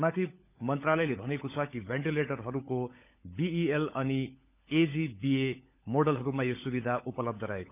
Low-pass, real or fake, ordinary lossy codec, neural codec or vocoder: 3.6 kHz; fake; none; codec, 16 kHz, 16 kbps, FunCodec, trained on LibriTTS, 50 frames a second